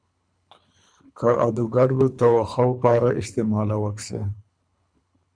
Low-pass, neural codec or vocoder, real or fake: 9.9 kHz; codec, 24 kHz, 3 kbps, HILCodec; fake